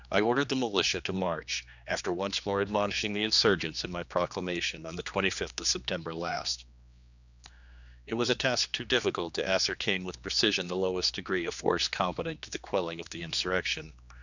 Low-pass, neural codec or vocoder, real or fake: 7.2 kHz; codec, 16 kHz, 2 kbps, X-Codec, HuBERT features, trained on general audio; fake